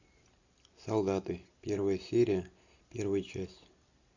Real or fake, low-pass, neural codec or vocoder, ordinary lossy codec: real; 7.2 kHz; none; AAC, 48 kbps